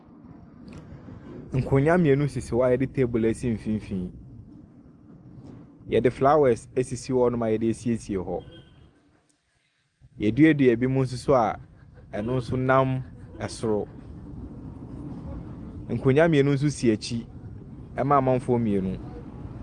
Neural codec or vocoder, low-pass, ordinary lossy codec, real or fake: none; 10.8 kHz; Opus, 32 kbps; real